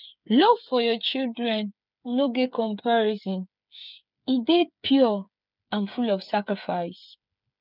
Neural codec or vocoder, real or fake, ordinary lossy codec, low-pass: codec, 16 kHz, 8 kbps, FreqCodec, smaller model; fake; none; 5.4 kHz